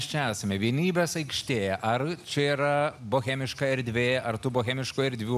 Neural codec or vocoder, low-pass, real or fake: none; 14.4 kHz; real